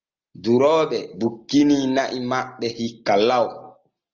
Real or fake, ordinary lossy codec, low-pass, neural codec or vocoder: real; Opus, 32 kbps; 7.2 kHz; none